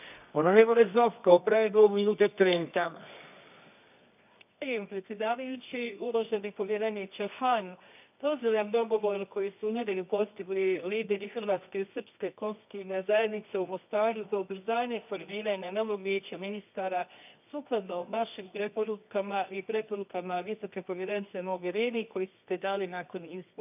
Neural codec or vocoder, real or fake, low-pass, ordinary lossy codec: codec, 24 kHz, 0.9 kbps, WavTokenizer, medium music audio release; fake; 3.6 kHz; none